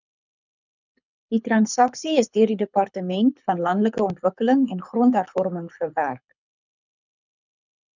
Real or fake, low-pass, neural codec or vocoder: fake; 7.2 kHz; codec, 24 kHz, 6 kbps, HILCodec